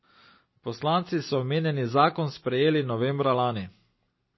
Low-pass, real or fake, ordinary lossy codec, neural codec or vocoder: 7.2 kHz; real; MP3, 24 kbps; none